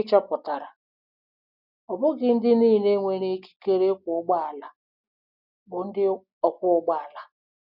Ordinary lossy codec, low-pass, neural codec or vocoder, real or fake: none; 5.4 kHz; none; real